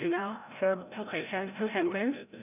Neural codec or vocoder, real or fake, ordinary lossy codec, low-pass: codec, 16 kHz, 0.5 kbps, FreqCodec, larger model; fake; none; 3.6 kHz